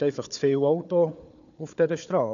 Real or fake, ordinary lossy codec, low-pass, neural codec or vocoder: fake; none; 7.2 kHz; codec, 16 kHz, 4 kbps, FunCodec, trained on Chinese and English, 50 frames a second